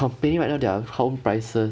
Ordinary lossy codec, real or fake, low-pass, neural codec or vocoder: none; real; none; none